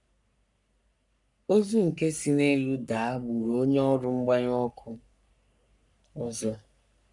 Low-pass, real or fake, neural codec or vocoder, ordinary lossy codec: 10.8 kHz; fake; codec, 44.1 kHz, 3.4 kbps, Pupu-Codec; none